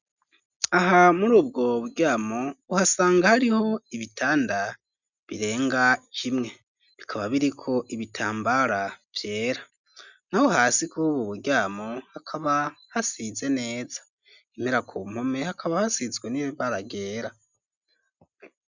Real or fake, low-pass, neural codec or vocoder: real; 7.2 kHz; none